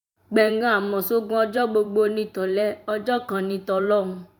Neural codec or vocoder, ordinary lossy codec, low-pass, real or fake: vocoder, 44.1 kHz, 128 mel bands every 512 samples, BigVGAN v2; none; 19.8 kHz; fake